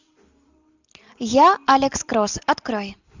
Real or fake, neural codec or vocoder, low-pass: real; none; 7.2 kHz